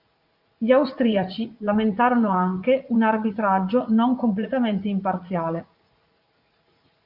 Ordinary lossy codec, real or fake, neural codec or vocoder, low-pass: Opus, 64 kbps; fake; vocoder, 44.1 kHz, 80 mel bands, Vocos; 5.4 kHz